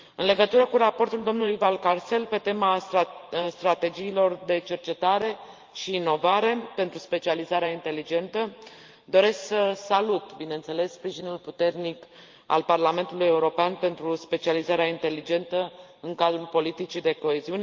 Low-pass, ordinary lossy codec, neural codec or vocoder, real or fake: 7.2 kHz; Opus, 24 kbps; vocoder, 44.1 kHz, 128 mel bands every 512 samples, BigVGAN v2; fake